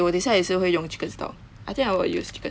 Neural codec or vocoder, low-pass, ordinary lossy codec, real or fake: none; none; none; real